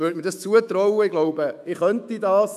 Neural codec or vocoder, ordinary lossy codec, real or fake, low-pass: autoencoder, 48 kHz, 128 numbers a frame, DAC-VAE, trained on Japanese speech; none; fake; 14.4 kHz